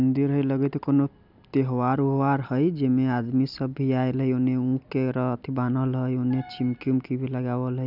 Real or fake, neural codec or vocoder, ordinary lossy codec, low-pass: real; none; none; 5.4 kHz